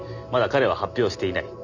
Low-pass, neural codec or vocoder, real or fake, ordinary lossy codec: 7.2 kHz; none; real; none